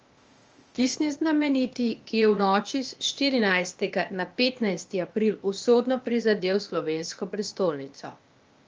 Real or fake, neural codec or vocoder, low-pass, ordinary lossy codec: fake; codec, 16 kHz, 0.8 kbps, ZipCodec; 7.2 kHz; Opus, 24 kbps